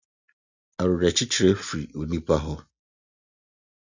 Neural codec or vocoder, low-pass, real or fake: none; 7.2 kHz; real